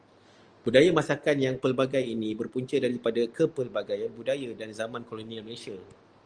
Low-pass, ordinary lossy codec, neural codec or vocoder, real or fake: 9.9 kHz; Opus, 24 kbps; none; real